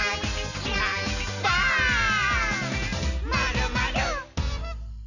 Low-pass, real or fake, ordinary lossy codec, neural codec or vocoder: 7.2 kHz; real; none; none